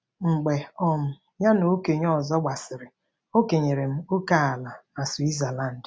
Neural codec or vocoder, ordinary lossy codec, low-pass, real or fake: none; none; none; real